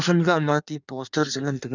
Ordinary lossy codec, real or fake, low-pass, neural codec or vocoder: none; fake; 7.2 kHz; codec, 16 kHz in and 24 kHz out, 1.1 kbps, FireRedTTS-2 codec